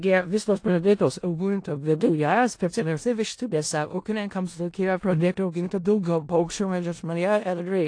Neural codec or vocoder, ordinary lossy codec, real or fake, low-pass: codec, 16 kHz in and 24 kHz out, 0.4 kbps, LongCat-Audio-Codec, four codebook decoder; AAC, 48 kbps; fake; 9.9 kHz